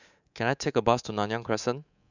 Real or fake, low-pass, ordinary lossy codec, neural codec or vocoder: fake; 7.2 kHz; none; codec, 24 kHz, 3.1 kbps, DualCodec